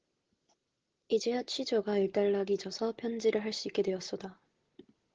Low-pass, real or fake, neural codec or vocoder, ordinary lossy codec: 7.2 kHz; real; none; Opus, 16 kbps